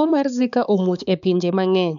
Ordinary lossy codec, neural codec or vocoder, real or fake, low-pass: none; codec, 16 kHz, 4 kbps, X-Codec, HuBERT features, trained on balanced general audio; fake; 7.2 kHz